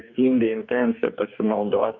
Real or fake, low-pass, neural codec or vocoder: fake; 7.2 kHz; codec, 44.1 kHz, 2.6 kbps, DAC